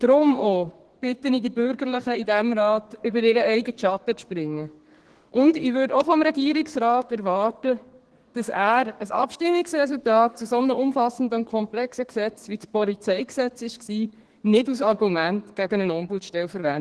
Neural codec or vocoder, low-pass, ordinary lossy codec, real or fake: codec, 32 kHz, 1.9 kbps, SNAC; 10.8 kHz; Opus, 16 kbps; fake